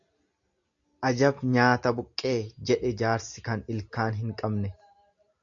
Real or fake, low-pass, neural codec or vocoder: real; 7.2 kHz; none